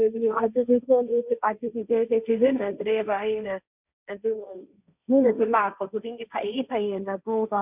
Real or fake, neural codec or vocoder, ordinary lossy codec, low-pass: fake; codec, 16 kHz, 1.1 kbps, Voila-Tokenizer; none; 3.6 kHz